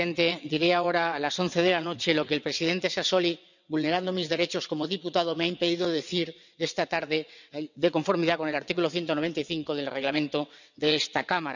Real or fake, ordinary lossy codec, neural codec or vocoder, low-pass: fake; none; vocoder, 22.05 kHz, 80 mel bands, WaveNeXt; 7.2 kHz